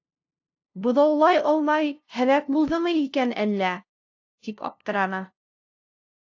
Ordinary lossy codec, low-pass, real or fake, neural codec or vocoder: AAC, 48 kbps; 7.2 kHz; fake; codec, 16 kHz, 0.5 kbps, FunCodec, trained on LibriTTS, 25 frames a second